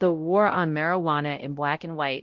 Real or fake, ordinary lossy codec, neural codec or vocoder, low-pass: fake; Opus, 16 kbps; codec, 16 kHz, 0.5 kbps, X-Codec, WavLM features, trained on Multilingual LibriSpeech; 7.2 kHz